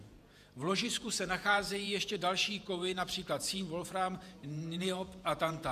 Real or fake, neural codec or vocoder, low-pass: fake; vocoder, 48 kHz, 128 mel bands, Vocos; 14.4 kHz